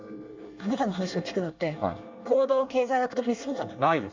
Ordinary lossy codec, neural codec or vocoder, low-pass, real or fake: MP3, 64 kbps; codec, 24 kHz, 1 kbps, SNAC; 7.2 kHz; fake